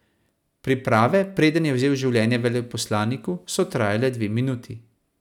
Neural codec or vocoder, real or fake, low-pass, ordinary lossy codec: none; real; 19.8 kHz; none